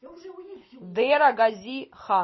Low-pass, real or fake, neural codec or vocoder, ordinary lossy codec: 7.2 kHz; real; none; MP3, 24 kbps